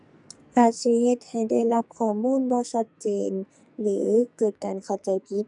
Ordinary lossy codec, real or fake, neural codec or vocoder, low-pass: none; fake; codec, 44.1 kHz, 2.6 kbps, SNAC; 10.8 kHz